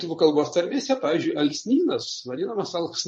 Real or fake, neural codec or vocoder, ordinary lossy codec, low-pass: fake; vocoder, 22.05 kHz, 80 mel bands, Vocos; MP3, 32 kbps; 9.9 kHz